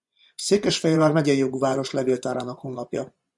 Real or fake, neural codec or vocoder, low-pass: fake; vocoder, 24 kHz, 100 mel bands, Vocos; 10.8 kHz